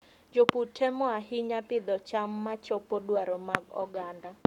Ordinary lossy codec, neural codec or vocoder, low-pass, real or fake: none; codec, 44.1 kHz, 7.8 kbps, Pupu-Codec; 19.8 kHz; fake